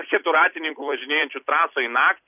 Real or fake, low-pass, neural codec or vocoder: fake; 3.6 kHz; vocoder, 44.1 kHz, 128 mel bands every 256 samples, BigVGAN v2